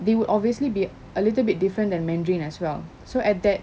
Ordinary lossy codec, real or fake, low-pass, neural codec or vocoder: none; real; none; none